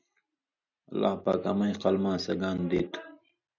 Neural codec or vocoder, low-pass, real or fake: none; 7.2 kHz; real